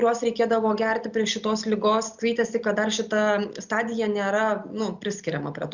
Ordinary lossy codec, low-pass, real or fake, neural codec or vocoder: Opus, 64 kbps; 7.2 kHz; real; none